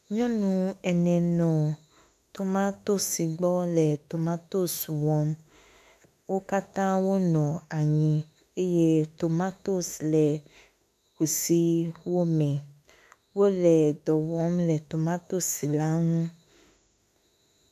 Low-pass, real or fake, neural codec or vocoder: 14.4 kHz; fake; autoencoder, 48 kHz, 32 numbers a frame, DAC-VAE, trained on Japanese speech